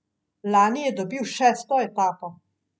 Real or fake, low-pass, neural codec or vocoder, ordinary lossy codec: real; none; none; none